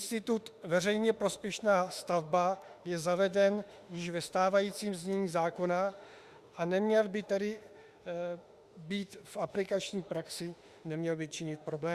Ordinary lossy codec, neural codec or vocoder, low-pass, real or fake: Opus, 64 kbps; autoencoder, 48 kHz, 32 numbers a frame, DAC-VAE, trained on Japanese speech; 14.4 kHz; fake